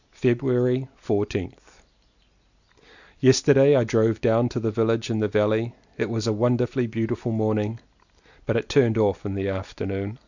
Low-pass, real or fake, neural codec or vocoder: 7.2 kHz; real; none